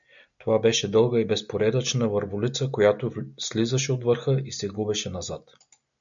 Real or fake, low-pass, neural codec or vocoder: real; 7.2 kHz; none